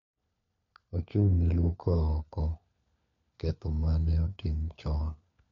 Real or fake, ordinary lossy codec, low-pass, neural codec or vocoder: fake; MP3, 48 kbps; 7.2 kHz; codec, 16 kHz, 4 kbps, FunCodec, trained on LibriTTS, 50 frames a second